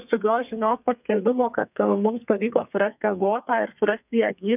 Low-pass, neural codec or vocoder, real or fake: 3.6 kHz; codec, 32 kHz, 1.9 kbps, SNAC; fake